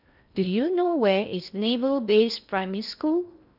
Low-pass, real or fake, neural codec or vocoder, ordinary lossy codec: 5.4 kHz; fake; codec, 16 kHz in and 24 kHz out, 0.6 kbps, FocalCodec, streaming, 2048 codes; none